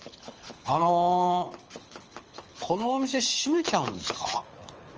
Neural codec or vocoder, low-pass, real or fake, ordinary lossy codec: codec, 24 kHz, 6 kbps, HILCodec; 7.2 kHz; fake; Opus, 24 kbps